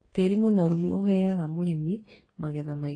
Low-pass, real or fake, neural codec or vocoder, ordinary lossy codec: 9.9 kHz; fake; codec, 44.1 kHz, 2.6 kbps, DAC; AAC, 32 kbps